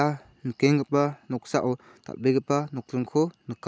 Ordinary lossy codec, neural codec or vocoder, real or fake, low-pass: none; none; real; none